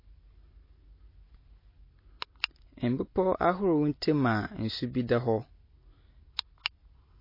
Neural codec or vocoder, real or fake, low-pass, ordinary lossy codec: none; real; 5.4 kHz; MP3, 24 kbps